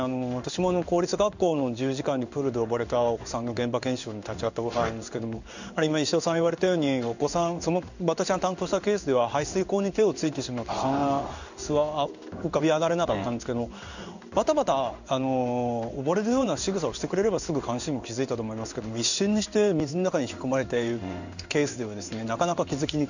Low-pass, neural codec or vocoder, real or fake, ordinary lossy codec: 7.2 kHz; codec, 16 kHz in and 24 kHz out, 1 kbps, XY-Tokenizer; fake; none